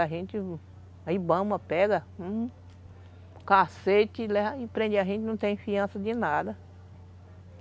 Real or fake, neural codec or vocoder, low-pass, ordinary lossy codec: real; none; none; none